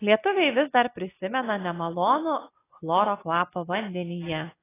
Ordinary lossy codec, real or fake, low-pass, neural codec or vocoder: AAC, 16 kbps; real; 3.6 kHz; none